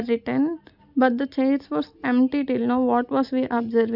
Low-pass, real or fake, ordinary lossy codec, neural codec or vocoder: 5.4 kHz; real; none; none